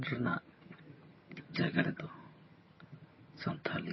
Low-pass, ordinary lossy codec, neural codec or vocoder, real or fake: 7.2 kHz; MP3, 24 kbps; vocoder, 22.05 kHz, 80 mel bands, HiFi-GAN; fake